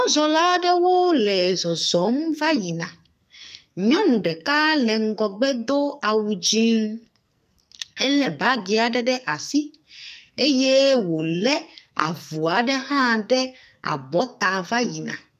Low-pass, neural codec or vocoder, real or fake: 14.4 kHz; codec, 44.1 kHz, 2.6 kbps, SNAC; fake